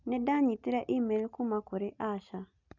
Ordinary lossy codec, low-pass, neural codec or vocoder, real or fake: none; 7.2 kHz; none; real